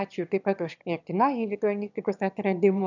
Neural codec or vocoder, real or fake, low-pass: autoencoder, 22.05 kHz, a latent of 192 numbers a frame, VITS, trained on one speaker; fake; 7.2 kHz